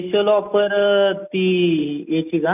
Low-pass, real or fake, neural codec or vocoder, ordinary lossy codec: 3.6 kHz; real; none; none